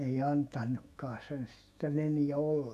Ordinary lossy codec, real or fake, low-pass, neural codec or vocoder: none; fake; 14.4 kHz; autoencoder, 48 kHz, 128 numbers a frame, DAC-VAE, trained on Japanese speech